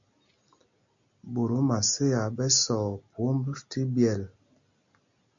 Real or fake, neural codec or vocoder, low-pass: real; none; 7.2 kHz